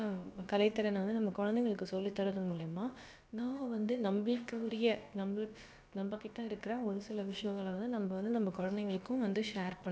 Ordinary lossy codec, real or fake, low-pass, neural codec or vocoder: none; fake; none; codec, 16 kHz, about 1 kbps, DyCAST, with the encoder's durations